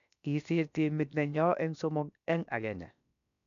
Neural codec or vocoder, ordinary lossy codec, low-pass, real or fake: codec, 16 kHz, about 1 kbps, DyCAST, with the encoder's durations; MP3, 96 kbps; 7.2 kHz; fake